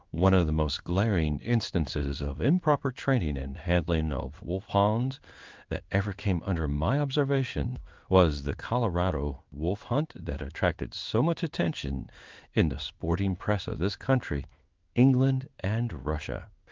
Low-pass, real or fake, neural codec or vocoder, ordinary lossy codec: 7.2 kHz; fake; codec, 16 kHz in and 24 kHz out, 1 kbps, XY-Tokenizer; Opus, 32 kbps